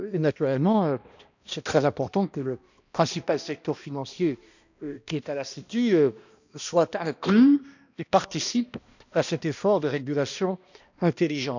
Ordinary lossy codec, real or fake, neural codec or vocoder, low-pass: none; fake; codec, 16 kHz, 1 kbps, X-Codec, HuBERT features, trained on balanced general audio; 7.2 kHz